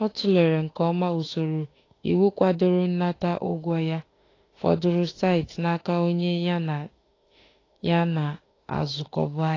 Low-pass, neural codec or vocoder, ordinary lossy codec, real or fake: 7.2 kHz; autoencoder, 48 kHz, 32 numbers a frame, DAC-VAE, trained on Japanese speech; AAC, 32 kbps; fake